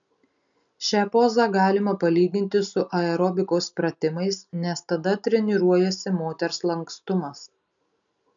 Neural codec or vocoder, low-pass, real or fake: none; 7.2 kHz; real